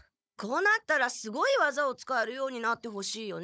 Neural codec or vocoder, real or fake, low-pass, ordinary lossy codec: codec, 16 kHz, 16 kbps, FunCodec, trained on Chinese and English, 50 frames a second; fake; none; none